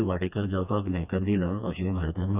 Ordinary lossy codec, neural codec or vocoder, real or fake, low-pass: none; codec, 16 kHz, 2 kbps, FreqCodec, smaller model; fake; 3.6 kHz